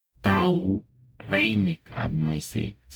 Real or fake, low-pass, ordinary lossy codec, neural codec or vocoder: fake; none; none; codec, 44.1 kHz, 0.9 kbps, DAC